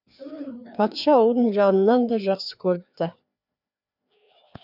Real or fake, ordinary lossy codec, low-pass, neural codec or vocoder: fake; none; 5.4 kHz; codec, 16 kHz, 2 kbps, FreqCodec, larger model